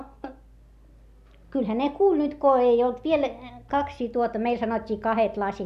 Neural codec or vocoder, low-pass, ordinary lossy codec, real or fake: none; 14.4 kHz; none; real